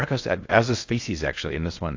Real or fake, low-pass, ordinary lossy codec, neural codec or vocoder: fake; 7.2 kHz; AAC, 48 kbps; codec, 16 kHz in and 24 kHz out, 0.6 kbps, FocalCodec, streaming, 4096 codes